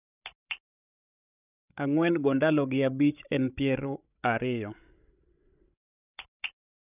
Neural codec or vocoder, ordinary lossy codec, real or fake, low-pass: codec, 16 kHz, 16 kbps, FreqCodec, larger model; none; fake; 3.6 kHz